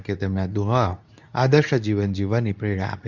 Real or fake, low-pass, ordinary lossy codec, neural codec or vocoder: fake; 7.2 kHz; none; codec, 24 kHz, 0.9 kbps, WavTokenizer, medium speech release version 2